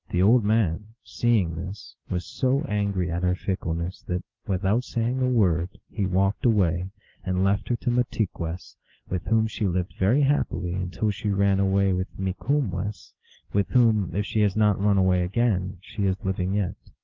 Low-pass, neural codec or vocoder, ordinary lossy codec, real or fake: 7.2 kHz; none; Opus, 32 kbps; real